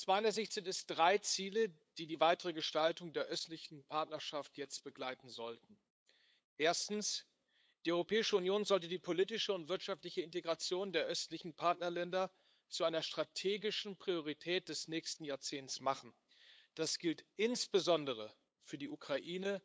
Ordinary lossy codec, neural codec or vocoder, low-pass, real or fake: none; codec, 16 kHz, 8 kbps, FunCodec, trained on LibriTTS, 25 frames a second; none; fake